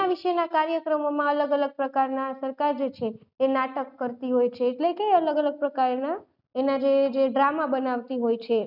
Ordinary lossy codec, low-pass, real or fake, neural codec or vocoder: none; 5.4 kHz; real; none